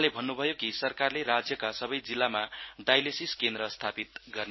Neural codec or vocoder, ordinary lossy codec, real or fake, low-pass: none; MP3, 24 kbps; real; 7.2 kHz